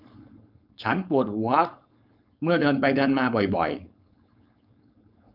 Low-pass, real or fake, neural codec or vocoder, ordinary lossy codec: 5.4 kHz; fake; codec, 16 kHz, 4.8 kbps, FACodec; none